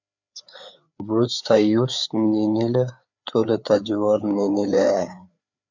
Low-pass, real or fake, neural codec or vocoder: 7.2 kHz; fake; codec, 16 kHz, 4 kbps, FreqCodec, larger model